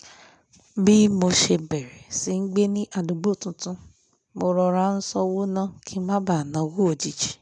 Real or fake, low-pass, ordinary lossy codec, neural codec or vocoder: real; 10.8 kHz; none; none